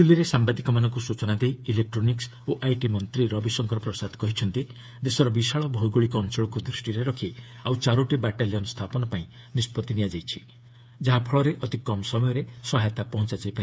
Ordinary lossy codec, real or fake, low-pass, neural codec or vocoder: none; fake; none; codec, 16 kHz, 8 kbps, FreqCodec, smaller model